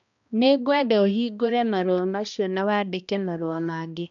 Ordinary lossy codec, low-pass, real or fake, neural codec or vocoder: none; 7.2 kHz; fake; codec, 16 kHz, 1 kbps, X-Codec, HuBERT features, trained on balanced general audio